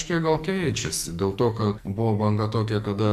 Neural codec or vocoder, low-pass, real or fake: codec, 44.1 kHz, 2.6 kbps, SNAC; 14.4 kHz; fake